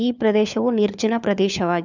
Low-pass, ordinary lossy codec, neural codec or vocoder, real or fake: 7.2 kHz; none; codec, 16 kHz, 4 kbps, FunCodec, trained on LibriTTS, 50 frames a second; fake